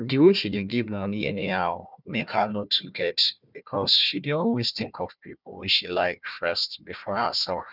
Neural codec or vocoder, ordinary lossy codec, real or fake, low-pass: codec, 16 kHz, 1 kbps, FunCodec, trained on Chinese and English, 50 frames a second; none; fake; 5.4 kHz